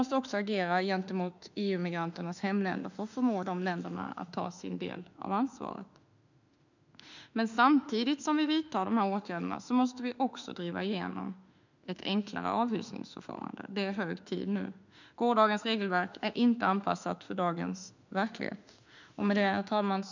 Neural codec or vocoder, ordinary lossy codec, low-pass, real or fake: autoencoder, 48 kHz, 32 numbers a frame, DAC-VAE, trained on Japanese speech; none; 7.2 kHz; fake